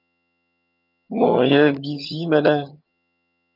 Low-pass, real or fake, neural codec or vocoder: 5.4 kHz; fake; vocoder, 22.05 kHz, 80 mel bands, HiFi-GAN